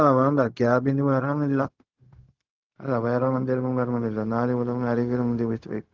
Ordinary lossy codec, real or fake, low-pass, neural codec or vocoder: Opus, 32 kbps; fake; 7.2 kHz; codec, 16 kHz, 0.4 kbps, LongCat-Audio-Codec